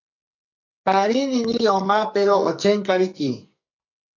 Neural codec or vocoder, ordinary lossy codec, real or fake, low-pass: codec, 44.1 kHz, 2.6 kbps, SNAC; MP3, 48 kbps; fake; 7.2 kHz